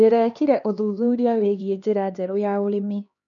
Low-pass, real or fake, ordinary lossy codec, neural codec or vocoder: 7.2 kHz; fake; none; codec, 16 kHz, 2 kbps, X-Codec, HuBERT features, trained on LibriSpeech